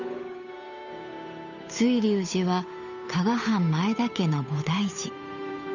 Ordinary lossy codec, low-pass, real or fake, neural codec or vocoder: none; 7.2 kHz; fake; codec, 16 kHz, 8 kbps, FunCodec, trained on Chinese and English, 25 frames a second